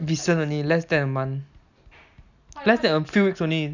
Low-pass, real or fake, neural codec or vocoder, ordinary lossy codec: 7.2 kHz; real; none; none